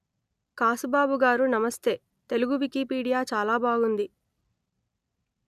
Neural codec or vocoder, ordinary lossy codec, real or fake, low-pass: none; none; real; 14.4 kHz